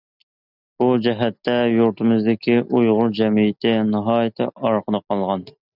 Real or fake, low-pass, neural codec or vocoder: real; 5.4 kHz; none